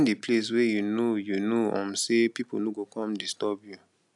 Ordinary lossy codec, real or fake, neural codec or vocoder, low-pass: none; real; none; 10.8 kHz